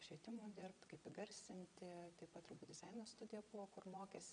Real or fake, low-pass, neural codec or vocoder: fake; 9.9 kHz; vocoder, 22.05 kHz, 80 mel bands, Vocos